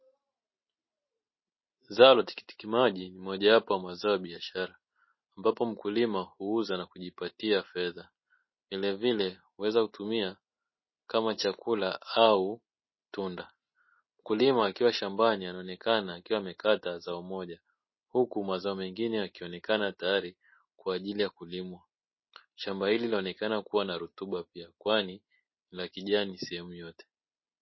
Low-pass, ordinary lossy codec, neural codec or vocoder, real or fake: 7.2 kHz; MP3, 24 kbps; none; real